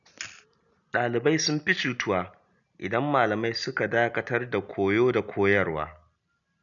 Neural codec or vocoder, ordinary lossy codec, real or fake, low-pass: none; none; real; 7.2 kHz